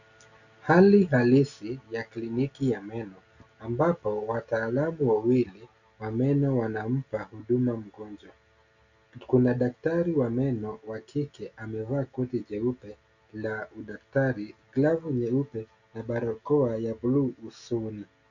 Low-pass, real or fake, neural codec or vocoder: 7.2 kHz; real; none